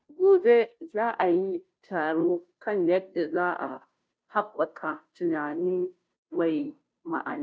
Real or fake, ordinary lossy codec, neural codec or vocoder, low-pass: fake; none; codec, 16 kHz, 0.5 kbps, FunCodec, trained on Chinese and English, 25 frames a second; none